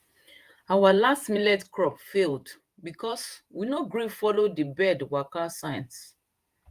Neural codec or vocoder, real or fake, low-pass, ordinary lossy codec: vocoder, 48 kHz, 128 mel bands, Vocos; fake; 14.4 kHz; Opus, 32 kbps